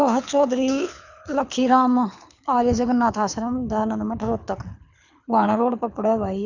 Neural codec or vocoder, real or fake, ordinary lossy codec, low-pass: codec, 24 kHz, 6 kbps, HILCodec; fake; none; 7.2 kHz